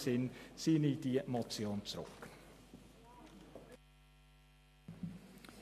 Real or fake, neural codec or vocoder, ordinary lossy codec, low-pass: real; none; MP3, 64 kbps; 14.4 kHz